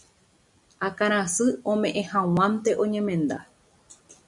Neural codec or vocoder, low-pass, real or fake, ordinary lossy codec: none; 10.8 kHz; real; MP3, 96 kbps